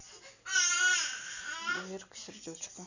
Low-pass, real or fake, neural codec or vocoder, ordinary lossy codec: 7.2 kHz; real; none; none